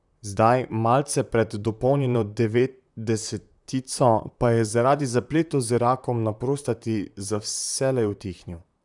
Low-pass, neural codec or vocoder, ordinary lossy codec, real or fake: 10.8 kHz; vocoder, 44.1 kHz, 128 mel bands, Pupu-Vocoder; none; fake